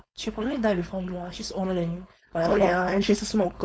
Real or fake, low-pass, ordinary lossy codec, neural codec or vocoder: fake; none; none; codec, 16 kHz, 4.8 kbps, FACodec